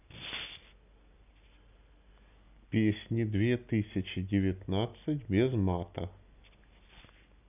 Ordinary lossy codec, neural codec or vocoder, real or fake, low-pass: none; none; real; 3.6 kHz